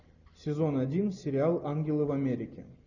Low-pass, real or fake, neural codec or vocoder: 7.2 kHz; real; none